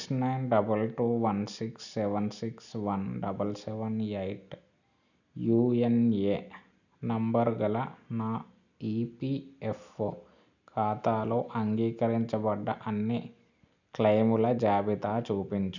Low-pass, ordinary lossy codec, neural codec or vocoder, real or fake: 7.2 kHz; none; none; real